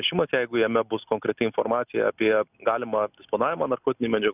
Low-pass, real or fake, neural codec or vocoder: 3.6 kHz; real; none